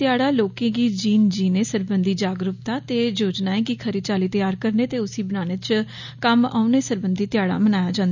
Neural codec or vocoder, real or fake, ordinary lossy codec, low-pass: none; real; none; 7.2 kHz